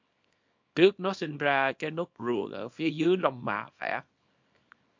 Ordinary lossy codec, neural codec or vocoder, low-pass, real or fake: MP3, 48 kbps; codec, 24 kHz, 0.9 kbps, WavTokenizer, small release; 7.2 kHz; fake